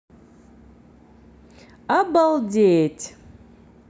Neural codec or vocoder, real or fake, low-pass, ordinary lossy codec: none; real; none; none